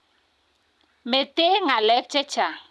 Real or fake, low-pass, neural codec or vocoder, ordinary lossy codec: real; none; none; none